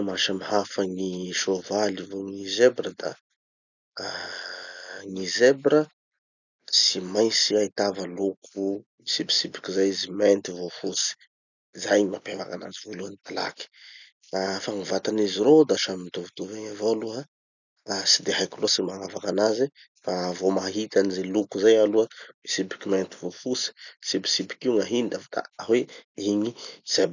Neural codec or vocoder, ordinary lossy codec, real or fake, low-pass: none; none; real; 7.2 kHz